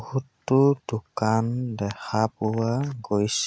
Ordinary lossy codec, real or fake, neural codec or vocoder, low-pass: none; real; none; none